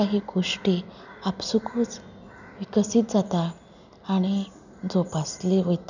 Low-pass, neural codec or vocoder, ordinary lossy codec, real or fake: 7.2 kHz; none; none; real